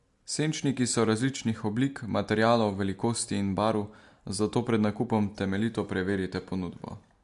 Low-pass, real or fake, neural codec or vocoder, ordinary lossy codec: 10.8 kHz; real; none; MP3, 64 kbps